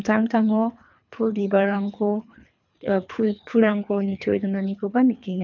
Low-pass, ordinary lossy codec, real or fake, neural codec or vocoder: 7.2 kHz; none; fake; codec, 24 kHz, 3 kbps, HILCodec